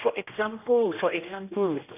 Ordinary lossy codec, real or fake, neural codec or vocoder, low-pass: none; fake; codec, 16 kHz, 1 kbps, X-Codec, HuBERT features, trained on general audio; 3.6 kHz